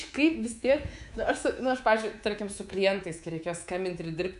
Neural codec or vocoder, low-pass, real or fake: codec, 24 kHz, 3.1 kbps, DualCodec; 10.8 kHz; fake